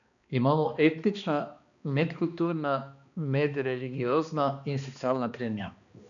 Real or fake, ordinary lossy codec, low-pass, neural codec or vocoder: fake; none; 7.2 kHz; codec, 16 kHz, 2 kbps, X-Codec, HuBERT features, trained on balanced general audio